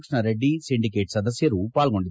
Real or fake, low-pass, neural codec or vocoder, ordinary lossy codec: real; none; none; none